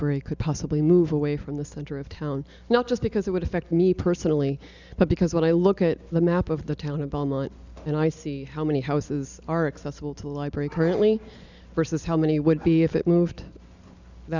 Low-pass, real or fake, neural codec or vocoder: 7.2 kHz; real; none